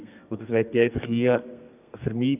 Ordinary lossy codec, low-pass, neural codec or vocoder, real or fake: none; 3.6 kHz; codec, 32 kHz, 1.9 kbps, SNAC; fake